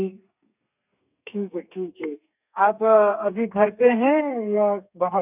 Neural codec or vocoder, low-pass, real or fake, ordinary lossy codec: codec, 32 kHz, 1.9 kbps, SNAC; 3.6 kHz; fake; none